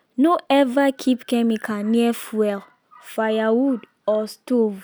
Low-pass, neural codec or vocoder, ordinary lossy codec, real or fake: none; none; none; real